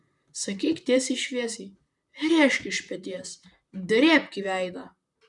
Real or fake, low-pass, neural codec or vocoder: fake; 10.8 kHz; vocoder, 44.1 kHz, 128 mel bands, Pupu-Vocoder